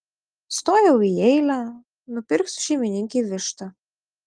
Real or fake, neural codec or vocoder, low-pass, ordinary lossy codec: real; none; 9.9 kHz; Opus, 32 kbps